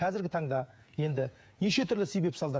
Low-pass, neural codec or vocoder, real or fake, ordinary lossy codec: none; codec, 16 kHz, 8 kbps, FreqCodec, smaller model; fake; none